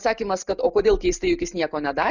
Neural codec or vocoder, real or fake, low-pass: none; real; 7.2 kHz